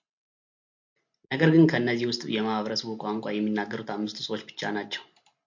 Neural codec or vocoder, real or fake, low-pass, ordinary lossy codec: none; real; 7.2 kHz; MP3, 48 kbps